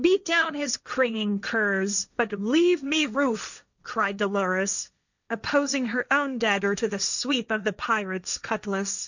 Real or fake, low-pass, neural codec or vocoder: fake; 7.2 kHz; codec, 16 kHz, 1.1 kbps, Voila-Tokenizer